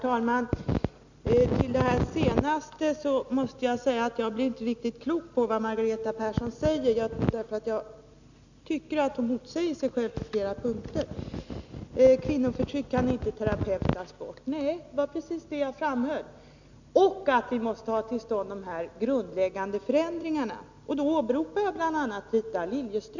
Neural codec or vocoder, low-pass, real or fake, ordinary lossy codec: none; 7.2 kHz; real; none